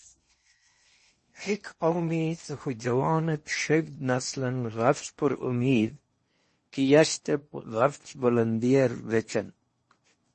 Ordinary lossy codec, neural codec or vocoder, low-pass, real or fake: MP3, 32 kbps; codec, 16 kHz in and 24 kHz out, 0.8 kbps, FocalCodec, streaming, 65536 codes; 10.8 kHz; fake